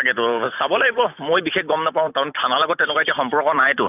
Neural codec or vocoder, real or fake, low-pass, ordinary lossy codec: vocoder, 44.1 kHz, 128 mel bands every 256 samples, BigVGAN v2; fake; 3.6 kHz; none